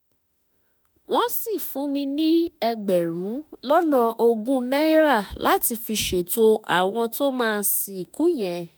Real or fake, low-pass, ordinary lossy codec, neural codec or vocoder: fake; none; none; autoencoder, 48 kHz, 32 numbers a frame, DAC-VAE, trained on Japanese speech